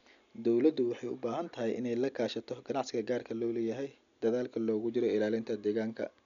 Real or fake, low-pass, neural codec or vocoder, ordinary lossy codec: real; 7.2 kHz; none; none